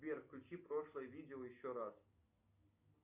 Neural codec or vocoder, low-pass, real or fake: none; 3.6 kHz; real